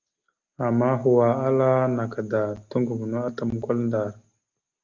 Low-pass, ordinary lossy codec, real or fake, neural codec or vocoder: 7.2 kHz; Opus, 24 kbps; real; none